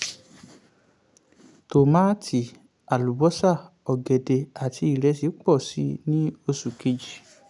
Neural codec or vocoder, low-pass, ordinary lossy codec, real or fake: none; 10.8 kHz; none; real